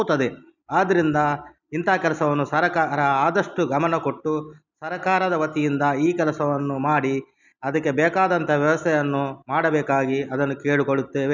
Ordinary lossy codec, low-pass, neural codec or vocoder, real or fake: none; 7.2 kHz; none; real